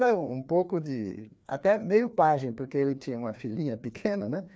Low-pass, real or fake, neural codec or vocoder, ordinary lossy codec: none; fake; codec, 16 kHz, 2 kbps, FreqCodec, larger model; none